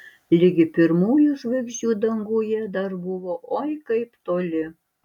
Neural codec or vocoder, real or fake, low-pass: none; real; 19.8 kHz